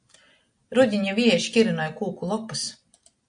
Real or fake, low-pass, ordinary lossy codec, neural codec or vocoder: real; 9.9 kHz; AAC, 64 kbps; none